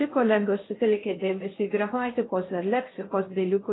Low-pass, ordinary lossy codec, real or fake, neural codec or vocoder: 7.2 kHz; AAC, 16 kbps; fake; codec, 16 kHz in and 24 kHz out, 0.6 kbps, FocalCodec, streaming, 4096 codes